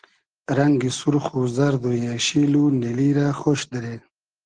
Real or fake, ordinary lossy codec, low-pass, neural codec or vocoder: real; Opus, 16 kbps; 9.9 kHz; none